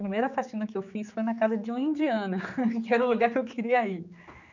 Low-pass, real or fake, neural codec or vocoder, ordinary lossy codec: 7.2 kHz; fake; codec, 16 kHz, 4 kbps, X-Codec, HuBERT features, trained on general audio; none